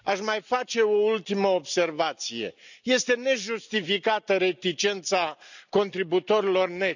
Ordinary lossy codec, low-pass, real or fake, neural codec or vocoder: none; 7.2 kHz; real; none